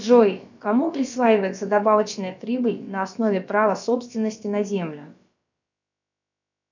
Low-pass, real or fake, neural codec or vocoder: 7.2 kHz; fake; codec, 16 kHz, about 1 kbps, DyCAST, with the encoder's durations